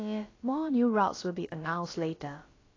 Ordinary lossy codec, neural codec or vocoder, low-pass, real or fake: AAC, 32 kbps; codec, 16 kHz, about 1 kbps, DyCAST, with the encoder's durations; 7.2 kHz; fake